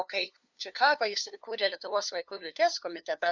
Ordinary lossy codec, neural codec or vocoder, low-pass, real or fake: Opus, 64 kbps; codec, 16 kHz, 2 kbps, FunCodec, trained on LibriTTS, 25 frames a second; 7.2 kHz; fake